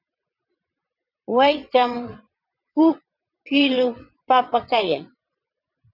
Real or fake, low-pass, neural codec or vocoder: fake; 5.4 kHz; vocoder, 22.05 kHz, 80 mel bands, WaveNeXt